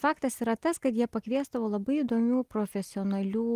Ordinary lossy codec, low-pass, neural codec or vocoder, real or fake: Opus, 24 kbps; 14.4 kHz; none; real